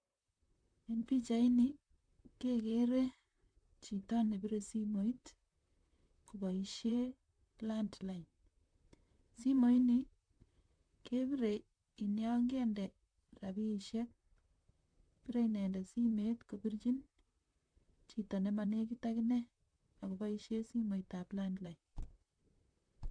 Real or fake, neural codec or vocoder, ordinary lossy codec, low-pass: fake; vocoder, 44.1 kHz, 128 mel bands, Pupu-Vocoder; none; 9.9 kHz